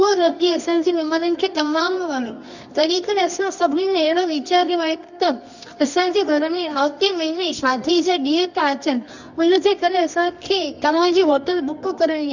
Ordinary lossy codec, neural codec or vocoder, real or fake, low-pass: none; codec, 24 kHz, 0.9 kbps, WavTokenizer, medium music audio release; fake; 7.2 kHz